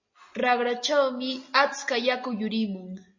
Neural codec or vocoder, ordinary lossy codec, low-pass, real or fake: none; MP3, 32 kbps; 7.2 kHz; real